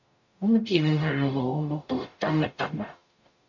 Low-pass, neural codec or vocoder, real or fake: 7.2 kHz; codec, 44.1 kHz, 0.9 kbps, DAC; fake